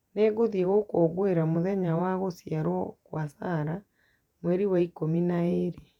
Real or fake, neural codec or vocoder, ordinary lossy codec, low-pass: fake; vocoder, 48 kHz, 128 mel bands, Vocos; none; 19.8 kHz